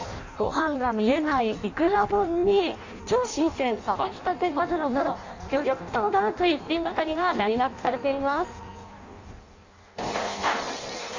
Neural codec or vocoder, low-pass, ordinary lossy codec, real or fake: codec, 16 kHz in and 24 kHz out, 0.6 kbps, FireRedTTS-2 codec; 7.2 kHz; AAC, 48 kbps; fake